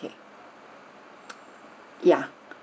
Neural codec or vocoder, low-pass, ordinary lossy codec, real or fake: none; none; none; real